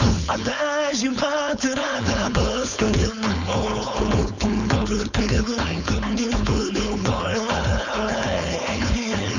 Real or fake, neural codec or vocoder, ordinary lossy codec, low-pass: fake; codec, 16 kHz, 4.8 kbps, FACodec; none; 7.2 kHz